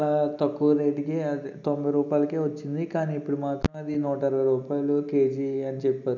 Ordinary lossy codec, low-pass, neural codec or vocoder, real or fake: none; 7.2 kHz; none; real